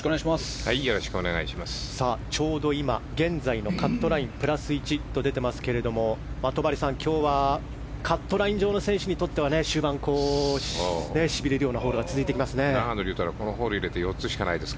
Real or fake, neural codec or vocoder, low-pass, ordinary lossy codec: real; none; none; none